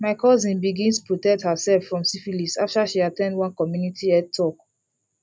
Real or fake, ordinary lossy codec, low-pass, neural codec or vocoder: real; none; none; none